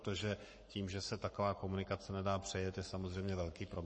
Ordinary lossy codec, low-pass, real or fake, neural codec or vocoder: MP3, 32 kbps; 9.9 kHz; fake; codec, 44.1 kHz, 7.8 kbps, Pupu-Codec